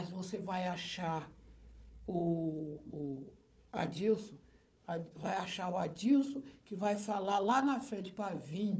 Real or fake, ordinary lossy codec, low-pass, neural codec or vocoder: fake; none; none; codec, 16 kHz, 4 kbps, FunCodec, trained on Chinese and English, 50 frames a second